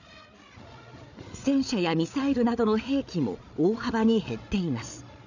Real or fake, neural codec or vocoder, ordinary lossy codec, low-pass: fake; codec, 16 kHz, 8 kbps, FreqCodec, larger model; none; 7.2 kHz